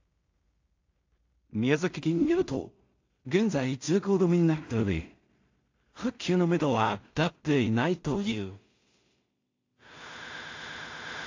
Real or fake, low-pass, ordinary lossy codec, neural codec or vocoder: fake; 7.2 kHz; AAC, 48 kbps; codec, 16 kHz in and 24 kHz out, 0.4 kbps, LongCat-Audio-Codec, two codebook decoder